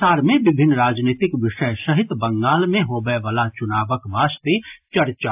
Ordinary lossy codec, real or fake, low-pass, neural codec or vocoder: AAC, 32 kbps; real; 3.6 kHz; none